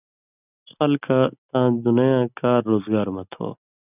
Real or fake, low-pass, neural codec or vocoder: real; 3.6 kHz; none